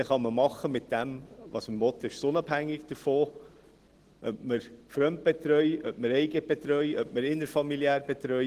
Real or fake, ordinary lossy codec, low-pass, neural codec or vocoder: real; Opus, 16 kbps; 14.4 kHz; none